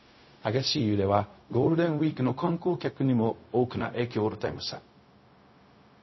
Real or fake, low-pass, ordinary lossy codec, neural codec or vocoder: fake; 7.2 kHz; MP3, 24 kbps; codec, 16 kHz, 0.4 kbps, LongCat-Audio-Codec